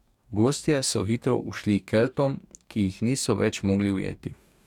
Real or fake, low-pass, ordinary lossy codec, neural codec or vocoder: fake; 19.8 kHz; none; codec, 44.1 kHz, 2.6 kbps, DAC